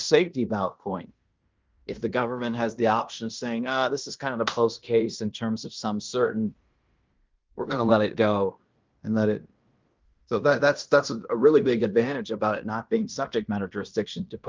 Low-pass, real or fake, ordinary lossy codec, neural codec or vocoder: 7.2 kHz; fake; Opus, 24 kbps; codec, 16 kHz in and 24 kHz out, 0.9 kbps, LongCat-Audio-Codec, fine tuned four codebook decoder